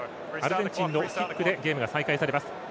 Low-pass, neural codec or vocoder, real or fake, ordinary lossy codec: none; none; real; none